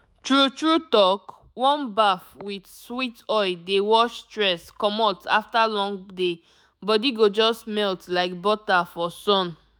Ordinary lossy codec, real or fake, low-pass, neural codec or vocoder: none; fake; none; autoencoder, 48 kHz, 128 numbers a frame, DAC-VAE, trained on Japanese speech